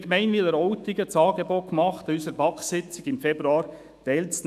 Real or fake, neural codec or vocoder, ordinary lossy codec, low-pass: fake; autoencoder, 48 kHz, 128 numbers a frame, DAC-VAE, trained on Japanese speech; none; 14.4 kHz